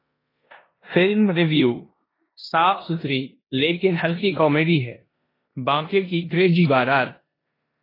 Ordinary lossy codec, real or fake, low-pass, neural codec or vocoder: AAC, 24 kbps; fake; 5.4 kHz; codec, 16 kHz in and 24 kHz out, 0.9 kbps, LongCat-Audio-Codec, four codebook decoder